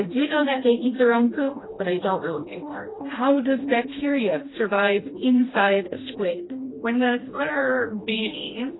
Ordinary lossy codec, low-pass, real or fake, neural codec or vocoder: AAC, 16 kbps; 7.2 kHz; fake; codec, 16 kHz, 1 kbps, FreqCodec, smaller model